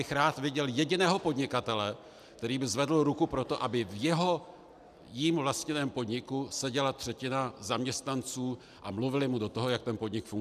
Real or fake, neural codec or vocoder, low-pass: real; none; 14.4 kHz